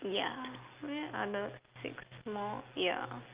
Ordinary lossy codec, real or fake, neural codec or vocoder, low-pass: Opus, 24 kbps; real; none; 3.6 kHz